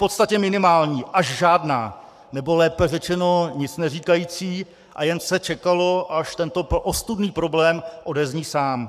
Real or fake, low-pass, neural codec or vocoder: fake; 14.4 kHz; codec, 44.1 kHz, 7.8 kbps, Pupu-Codec